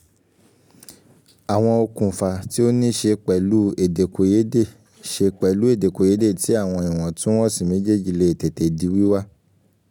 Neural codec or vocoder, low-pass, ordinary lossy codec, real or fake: none; 19.8 kHz; none; real